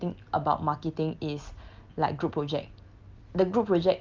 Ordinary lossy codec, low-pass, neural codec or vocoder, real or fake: Opus, 32 kbps; 7.2 kHz; none; real